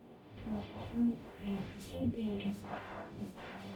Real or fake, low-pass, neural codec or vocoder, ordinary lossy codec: fake; 19.8 kHz; codec, 44.1 kHz, 0.9 kbps, DAC; none